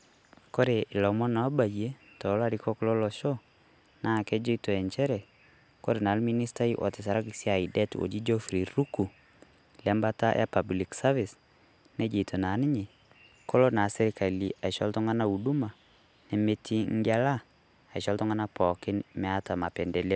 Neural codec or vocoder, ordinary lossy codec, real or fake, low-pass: none; none; real; none